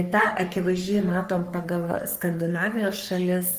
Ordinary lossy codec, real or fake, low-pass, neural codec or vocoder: Opus, 32 kbps; fake; 14.4 kHz; codec, 44.1 kHz, 3.4 kbps, Pupu-Codec